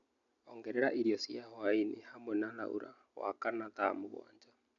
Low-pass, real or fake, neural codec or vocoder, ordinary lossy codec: 7.2 kHz; real; none; none